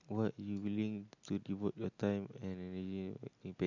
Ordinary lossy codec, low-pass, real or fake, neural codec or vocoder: none; 7.2 kHz; real; none